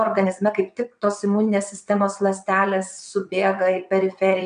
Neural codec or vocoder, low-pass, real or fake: vocoder, 22.05 kHz, 80 mel bands, WaveNeXt; 9.9 kHz; fake